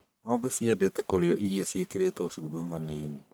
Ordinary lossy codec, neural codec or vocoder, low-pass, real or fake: none; codec, 44.1 kHz, 1.7 kbps, Pupu-Codec; none; fake